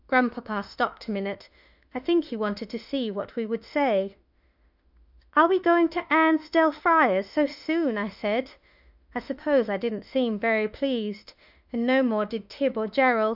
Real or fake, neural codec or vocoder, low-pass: fake; autoencoder, 48 kHz, 32 numbers a frame, DAC-VAE, trained on Japanese speech; 5.4 kHz